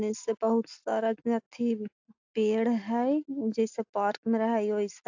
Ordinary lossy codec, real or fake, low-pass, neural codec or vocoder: AAC, 48 kbps; real; 7.2 kHz; none